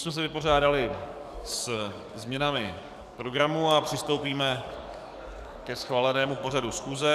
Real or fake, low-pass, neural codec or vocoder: fake; 14.4 kHz; codec, 44.1 kHz, 7.8 kbps, DAC